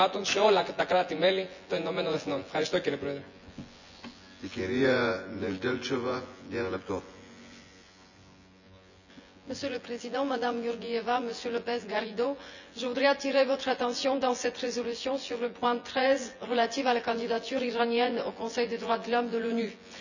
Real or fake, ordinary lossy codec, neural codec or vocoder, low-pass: fake; none; vocoder, 24 kHz, 100 mel bands, Vocos; 7.2 kHz